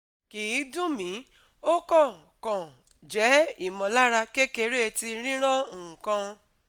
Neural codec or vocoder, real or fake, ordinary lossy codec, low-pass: none; real; none; 19.8 kHz